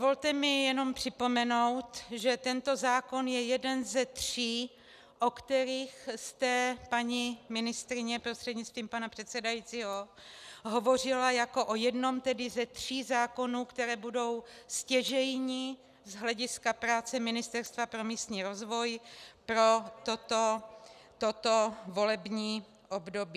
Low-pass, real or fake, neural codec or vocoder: 14.4 kHz; real; none